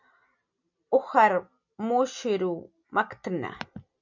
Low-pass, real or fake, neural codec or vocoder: 7.2 kHz; real; none